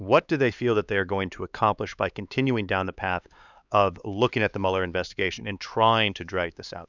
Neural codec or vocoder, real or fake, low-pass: codec, 16 kHz, 4 kbps, X-Codec, HuBERT features, trained on LibriSpeech; fake; 7.2 kHz